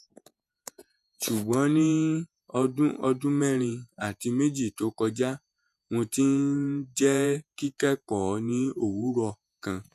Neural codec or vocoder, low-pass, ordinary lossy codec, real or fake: vocoder, 48 kHz, 128 mel bands, Vocos; 14.4 kHz; none; fake